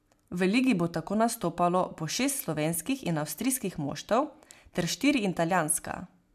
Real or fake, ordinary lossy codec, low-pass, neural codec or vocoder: real; MP3, 96 kbps; 14.4 kHz; none